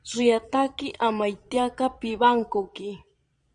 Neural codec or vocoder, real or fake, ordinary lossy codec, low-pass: vocoder, 22.05 kHz, 80 mel bands, Vocos; fake; Opus, 64 kbps; 9.9 kHz